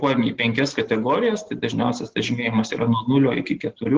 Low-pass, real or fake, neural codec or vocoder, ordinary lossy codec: 7.2 kHz; real; none; Opus, 16 kbps